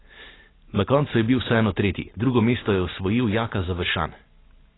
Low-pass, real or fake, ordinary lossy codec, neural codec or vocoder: 7.2 kHz; real; AAC, 16 kbps; none